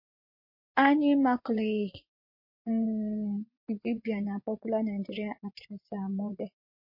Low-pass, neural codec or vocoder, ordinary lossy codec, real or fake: 5.4 kHz; vocoder, 24 kHz, 100 mel bands, Vocos; MP3, 32 kbps; fake